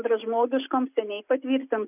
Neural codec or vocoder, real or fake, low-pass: none; real; 3.6 kHz